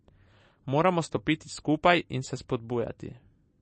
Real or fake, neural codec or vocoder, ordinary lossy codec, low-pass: fake; vocoder, 44.1 kHz, 128 mel bands every 256 samples, BigVGAN v2; MP3, 32 kbps; 10.8 kHz